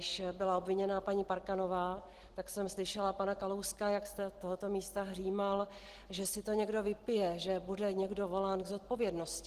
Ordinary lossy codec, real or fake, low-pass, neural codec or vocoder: Opus, 16 kbps; real; 14.4 kHz; none